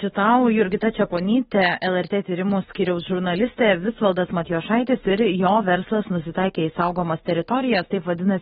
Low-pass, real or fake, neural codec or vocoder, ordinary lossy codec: 19.8 kHz; fake; autoencoder, 48 kHz, 128 numbers a frame, DAC-VAE, trained on Japanese speech; AAC, 16 kbps